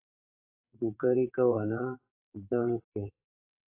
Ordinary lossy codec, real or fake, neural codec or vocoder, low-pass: AAC, 32 kbps; fake; vocoder, 22.05 kHz, 80 mel bands, WaveNeXt; 3.6 kHz